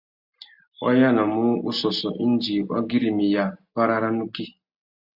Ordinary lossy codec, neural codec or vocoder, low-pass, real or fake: Opus, 64 kbps; none; 5.4 kHz; real